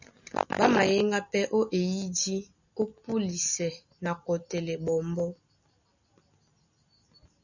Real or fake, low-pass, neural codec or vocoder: real; 7.2 kHz; none